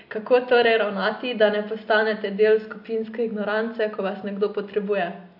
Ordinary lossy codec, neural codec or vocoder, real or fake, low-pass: AAC, 48 kbps; none; real; 5.4 kHz